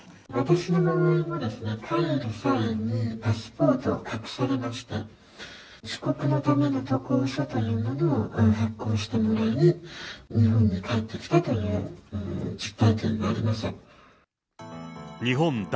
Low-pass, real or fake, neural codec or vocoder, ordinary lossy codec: none; real; none; none